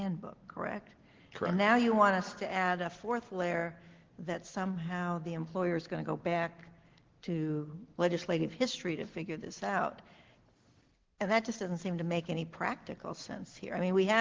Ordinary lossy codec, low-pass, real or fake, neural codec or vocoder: Opus, 16 kbps; 7.2 kHz; real; none